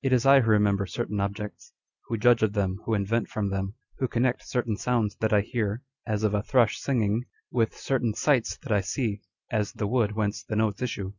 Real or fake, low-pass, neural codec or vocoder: real; 7.2 kHz; none